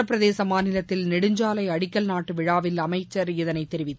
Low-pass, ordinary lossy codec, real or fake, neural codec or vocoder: none; none; real; none